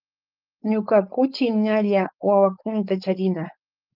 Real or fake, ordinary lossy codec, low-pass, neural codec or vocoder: fake; Opus, 24 kbps; 5.4 kHz; codec, 16 kHz, 4.8 kbps, FACodec